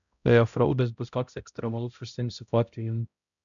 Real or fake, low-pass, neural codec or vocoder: fake; 7.2 kHz; codec, 16 kHz, 0.5 kbps, X-Codec, HuBERT features, trained on balanced general audio